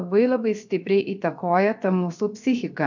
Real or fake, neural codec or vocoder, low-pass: fake; codec, 16 kHz, 0.7 kbps, FocalCodec; 7.2 kHz